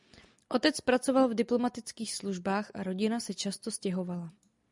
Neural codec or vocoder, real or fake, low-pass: none; real; 10.8 kHz